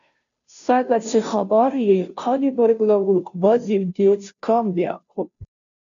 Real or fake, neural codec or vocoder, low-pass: fake; codec, 16 kHz, 0.5 kbps, FunCodec, trained on Chinese and English, 25 frames a second; 7.2 kHz